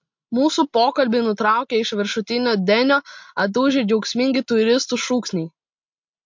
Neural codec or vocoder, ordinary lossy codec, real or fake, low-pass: none; MP3, 48 kbps; real; 7.2 kHz